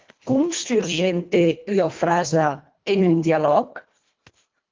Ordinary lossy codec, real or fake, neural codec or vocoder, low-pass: Opus, 24 kbps; fake; codec, 24 kHz, 1.5 kbps, HILCodec; 7.2 kHz